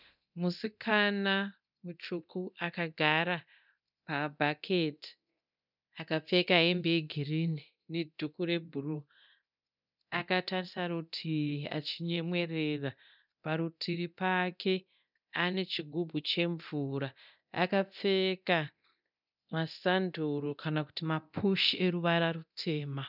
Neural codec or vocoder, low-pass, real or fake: codec, 24 kHz, 0.9 kbps, DualCodec; 5.4 kHz; fake